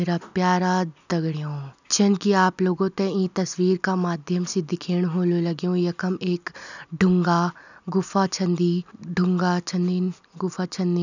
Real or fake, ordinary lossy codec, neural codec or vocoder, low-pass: real; none; none; 7.2 kHz